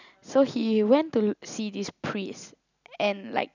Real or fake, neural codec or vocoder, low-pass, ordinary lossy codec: real; none; 7.2 kHz; none